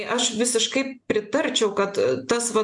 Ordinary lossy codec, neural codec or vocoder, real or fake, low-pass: AAC, 64 kbps; none; real; 10.8 kHz